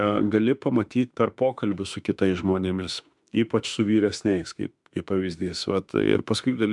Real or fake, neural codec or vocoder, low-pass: fake; autoencoder, 48 kHz, 32 numbers a frame, DAC-VAE, trained on Japanese speech; 10.8 kHz